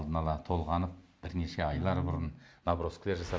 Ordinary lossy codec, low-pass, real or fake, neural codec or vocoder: none; none; real; none